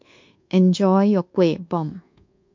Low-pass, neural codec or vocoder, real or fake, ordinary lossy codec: 7.2 kHz; codec, 24 kHz, 1.2 kbps, DualCodec; fake; MP3, 48 kbps